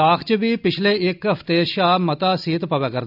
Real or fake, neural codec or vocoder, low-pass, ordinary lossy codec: real; none; 5.4 kHz; none